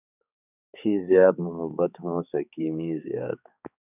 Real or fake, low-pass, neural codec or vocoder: fake; 3.6 kHz; codec, 16 kHz, 4 kbps, X-Codec, HuBERT features, trained on balanced general audio